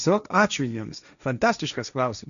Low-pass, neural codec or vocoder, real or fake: 7.2 kHz; codec, 16 kHz, 1.1 kbps, Voila-Tokenizer; fake